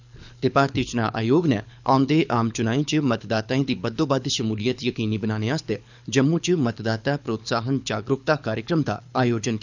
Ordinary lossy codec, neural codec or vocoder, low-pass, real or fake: none; codec, 24 kHz, 6 kbps, HILCodec; 7.2 kHz; fake